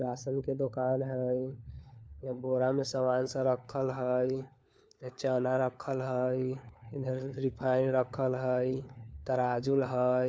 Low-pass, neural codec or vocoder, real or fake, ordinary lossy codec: none; codec, 16 kHz, 4 kbps, FunCodec, trained on LibriTTS, 50 frames a second; fake; none